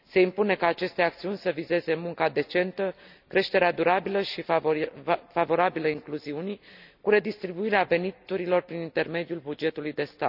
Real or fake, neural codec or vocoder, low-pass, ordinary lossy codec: real; none; 5.4 kHz; none